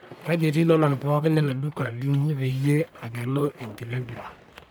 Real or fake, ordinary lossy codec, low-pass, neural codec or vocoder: fake; none; none; codec, 44.1 kHz, 1.7 kbps, Pupu-Codec